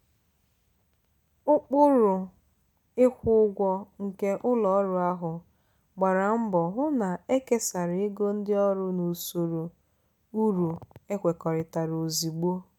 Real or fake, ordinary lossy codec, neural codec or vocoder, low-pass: real; none; none; 19.8 kHz